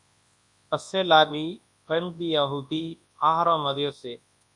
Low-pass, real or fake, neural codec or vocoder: 10.8 kHz; fake; codec, 24 kHz, 0.9 kbps, WavTokenizer, large speech release